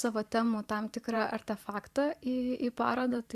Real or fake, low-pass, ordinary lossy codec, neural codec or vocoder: fake; 14.4 kHz; Opus, 64 kbps; vocoder, 44.1 kHz, 128 mel bands every 512 samples, BigVGAN v2